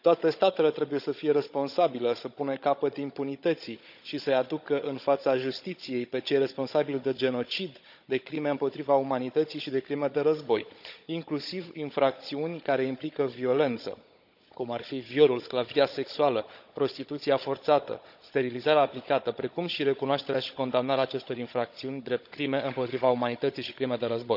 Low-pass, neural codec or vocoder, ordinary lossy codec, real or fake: 5.4 kHz; codec, 16 kHz, 16 kbps, FunCodec, trained on Chinese and English, 50 frames a second; none; fake